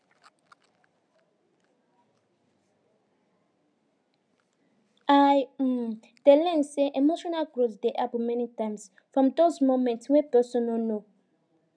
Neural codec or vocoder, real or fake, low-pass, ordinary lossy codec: none; real; 9.9 kHz; none